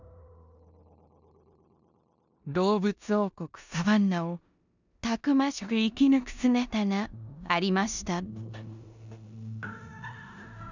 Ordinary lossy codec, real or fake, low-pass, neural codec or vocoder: none; fake; 7.2 kHz; codec, 16 kHz in and 24 kHz out, 0.9 kbps, LongCat-Audio-Codec, four codebook decoder